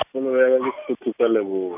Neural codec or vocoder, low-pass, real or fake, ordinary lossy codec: none; 3.6 kHz; real; none